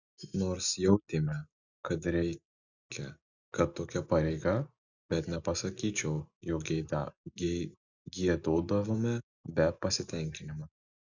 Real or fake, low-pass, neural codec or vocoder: real; 7.2 kHz; none